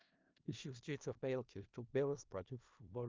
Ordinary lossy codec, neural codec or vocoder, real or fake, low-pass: Opus, 24 kbps; codec, 16 kHz in and 24 kHz out, 0.4 kbps, LongCat-Audio-Codec, four codebook decoder; fake; 7.2 kHz